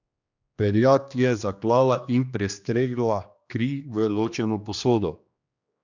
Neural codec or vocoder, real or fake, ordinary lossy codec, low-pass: codec, 16 kHz, 2 kbps, X-Codec, HuBERT features, trained on general audio; fake; none; 7.2 kHz